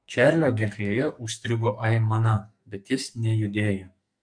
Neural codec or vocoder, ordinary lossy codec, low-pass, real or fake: codec, 44.1 kHz, 2.6 kbps, SNAC; MP3, 64 kbps; 9.9 kHz; fake